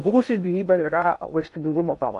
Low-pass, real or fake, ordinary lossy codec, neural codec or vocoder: 10.8 kHz; fake; MP3, 96 kbps; codec, 16 kHz in and 24 kHz out, 0.6 kbps, FocalCodec, streaming, 2048 codes